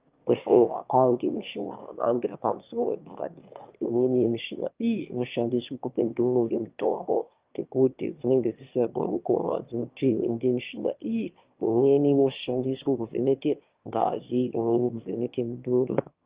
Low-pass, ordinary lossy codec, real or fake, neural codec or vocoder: 3.6 kHz; Opus, 24 kbps; fake; autoencoder, 22.05 kHz, a latent of 192 numbers a frame, VITS, trained on one speaker